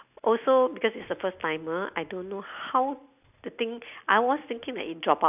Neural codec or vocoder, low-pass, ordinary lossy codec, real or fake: none; 3.6 kHz; none; real